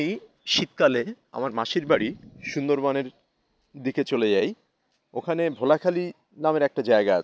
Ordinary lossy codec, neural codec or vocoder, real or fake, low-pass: none; none; real; none